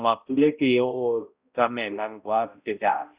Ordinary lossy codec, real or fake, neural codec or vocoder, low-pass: Opus, 64 kbps; fake; codec, 16 kHz, 0.5 kbps, X-Codec, HuBERT features, trained on balanced general audio; 3.6 kHz